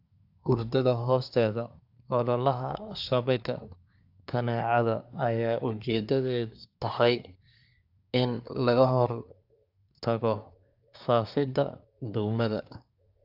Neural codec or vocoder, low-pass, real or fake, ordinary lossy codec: codec, 24 kHz, 1 kbps, SNAC; 5.4 kHz; fake; AAC, 48 kbps